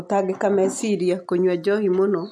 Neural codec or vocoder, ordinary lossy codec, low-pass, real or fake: none; none; none; real